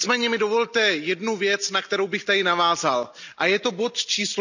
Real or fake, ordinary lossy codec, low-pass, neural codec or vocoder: real; none; 7.2 kHz; none